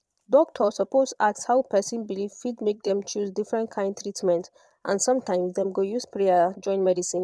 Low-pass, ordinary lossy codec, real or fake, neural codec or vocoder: none; none; fake; vocoder, 22.05 kHz, 80 mel bands, Vocos